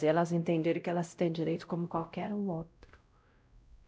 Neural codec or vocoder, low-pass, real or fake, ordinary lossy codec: codec, 16 kHz, 0.5 kbps, X-Codec, WavLM features, trained on Multilingual LibriSpeech; none; fake; none